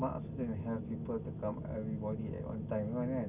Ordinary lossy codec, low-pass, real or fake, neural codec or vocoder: none; 3.6 kHz; real; none